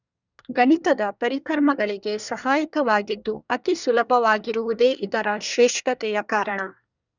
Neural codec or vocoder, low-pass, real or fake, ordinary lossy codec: codec, 24 kHz, 1 kbps, SNAC; 7.2 kHz; fake; none